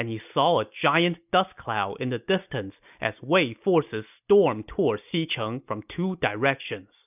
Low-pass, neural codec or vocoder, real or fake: 3.6 kHz; none; real